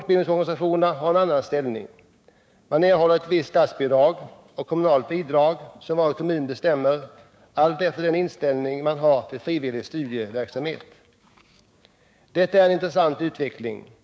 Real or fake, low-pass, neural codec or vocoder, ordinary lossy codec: fake; none; codec, 16 kHz, 6 kbps, DAC; none